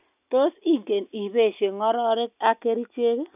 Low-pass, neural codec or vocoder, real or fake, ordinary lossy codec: 3.6 kHz; none; real; none